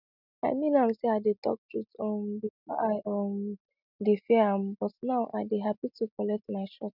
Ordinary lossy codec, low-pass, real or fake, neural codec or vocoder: none; 5.4 kHz; real; none